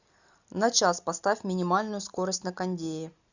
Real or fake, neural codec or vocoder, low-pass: real; none; 7.2 kHz